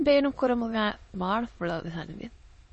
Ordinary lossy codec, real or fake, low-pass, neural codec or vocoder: MP3, 32 kbps; fake; 9.9 kHz; autoencoder, 22.05 kHz, a latent of 192 numbers a frame, VITS, trained on many speakers